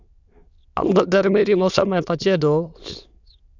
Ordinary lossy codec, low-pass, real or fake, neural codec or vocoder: Opus, 64 kbps; 7.2 kHz; fake; autoencoder, 22.05 kHz, a latent of 192 numbers a frame, VITS, trained on many speakers